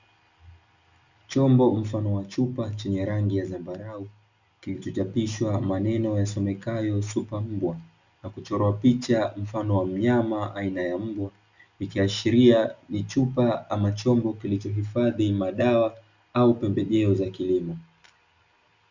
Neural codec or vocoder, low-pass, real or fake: none; 7.2 kHz; real